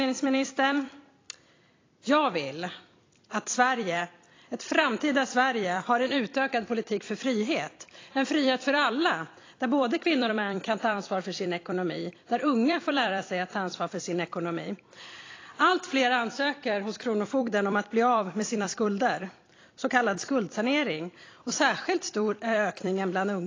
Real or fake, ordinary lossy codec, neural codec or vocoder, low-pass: fake; AAC, 32 kbps; vocoder, 44.1 kHz, 128 mel bands every 256 samples, BigVGAN v2; 7.2 kHz